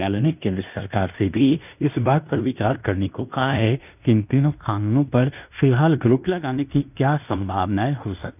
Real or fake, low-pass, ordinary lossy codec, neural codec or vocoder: fake; 3.6 kHz; none; codec, 16 kHz in and 24 kHz out, 0.9 kbps, LongCat-Audio-Codec, fine tuned four codebook decoder